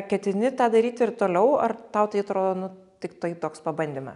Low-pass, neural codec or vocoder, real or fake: 10.8 kHz; none; real